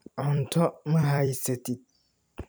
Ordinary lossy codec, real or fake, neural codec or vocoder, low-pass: none; fake; vocoder, 44.1 kHz, 128 mel bands every 256 samples, BigVGAN v2; none